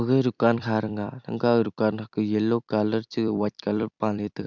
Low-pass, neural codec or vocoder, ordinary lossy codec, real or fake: 7.2 kHz; vocoder, 44.1 kHz, 128 mel bands every 256 samples, BigVGAN v2; none; fake